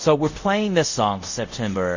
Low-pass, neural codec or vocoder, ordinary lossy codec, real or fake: 7.2 kHz; codec, 24 kHz, 0.5 kbps, DualCodec; Opus, 64 kbps; fake